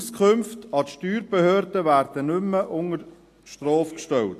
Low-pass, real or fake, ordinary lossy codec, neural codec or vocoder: 14.4 kHz; real; AAC, 64 kbps; none